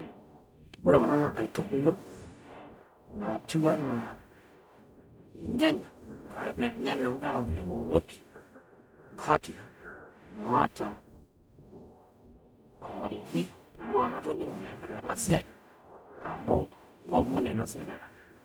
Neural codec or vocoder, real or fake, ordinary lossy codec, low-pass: codec, 44.1 kHz, 0.9 kbps, DAC; fake; none; none